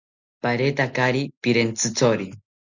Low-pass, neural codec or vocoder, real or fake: 7.2 kHz; none; real